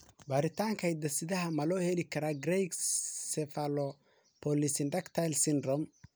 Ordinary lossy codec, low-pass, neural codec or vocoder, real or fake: none; none; none; real